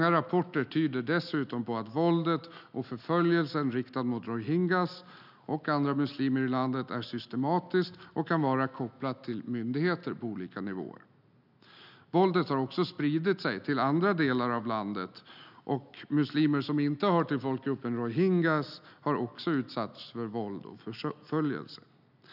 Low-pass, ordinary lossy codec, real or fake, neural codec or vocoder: 5.4 kHz; none; real; none